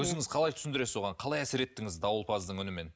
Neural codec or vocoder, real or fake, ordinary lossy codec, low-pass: none; real; none; none